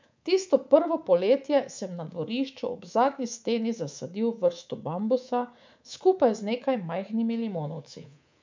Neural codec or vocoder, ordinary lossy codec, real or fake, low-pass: codec, 24 kHz, 3.1 kbps, DualCodec; MP3, 64 kbps; fake; 7.2 kHz